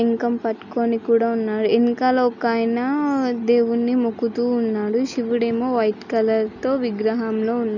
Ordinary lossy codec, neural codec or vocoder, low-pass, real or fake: none; none; 7.2 kHz; real